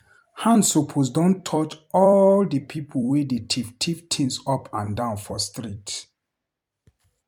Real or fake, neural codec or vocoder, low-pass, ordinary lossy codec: fake; vocoder, 44.1 kHz, 128 mel bands every 256 samples, BigVGAN v2; 19.8 kHz; MP3, 96 kbps